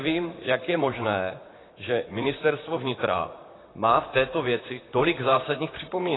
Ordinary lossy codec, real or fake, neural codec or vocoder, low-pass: AAC, 16 kbps; fake; vocoder, 44.1 kHz, 128 mel bands, Pupu-Vocoder; 7.2 kHz